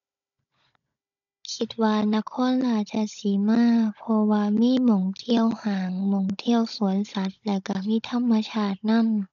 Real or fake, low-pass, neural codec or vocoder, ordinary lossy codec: fake; 7.2 kHz; codec, 16 kHz, 16 kbps, FunCodec, trained on Chinese and English, 50 frames a second; none